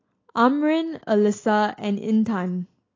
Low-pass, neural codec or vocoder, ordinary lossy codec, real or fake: 7.2 kHz; none; AAC, 32 kbps; real